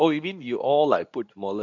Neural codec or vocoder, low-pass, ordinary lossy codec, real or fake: codec, 24 kHz, 0.9 kbps, WavTokenizer, medium speech release version 2; 7.2 kHz; none; fake